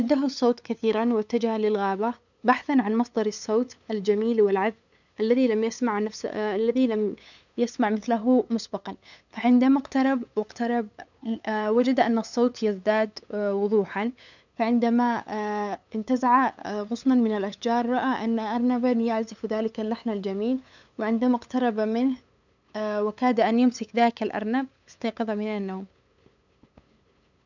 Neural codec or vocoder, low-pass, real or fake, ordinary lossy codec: codec, 16 kHz, 8 kbps, FunCodec, trained on LibriTTS, 25 frames a second; 7.2 kHz; fake; none